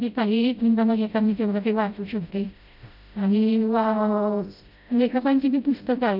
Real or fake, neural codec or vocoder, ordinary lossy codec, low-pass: fake; codec, 16 kHz, 0.5 kbps, FreqCodec, smaller model; none; 5.4 kHz